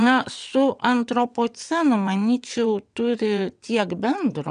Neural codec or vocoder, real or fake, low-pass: vocoder, 22.05 kHz, 80 mel bands, WaveNeXt; fake; 9.9 kHz